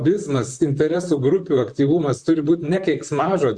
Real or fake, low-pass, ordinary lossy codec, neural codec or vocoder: fake; 9.9 kHz; Opus, 64 kbps; vocoder, 44.1 kHz, 128 mel bands, Pupu-Vocoder